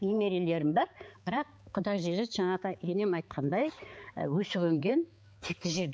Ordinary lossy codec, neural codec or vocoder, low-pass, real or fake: none; codec, 16 kHz, 4 kbps, X-Codec, HuBERT features, trained on balanced general audio; none; fake